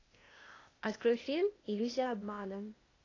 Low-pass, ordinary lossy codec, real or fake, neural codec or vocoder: 7.2 kHz; AAC, 32 kbps; fake; codec, 16 kHz, 0.8 kbps, ZipCodec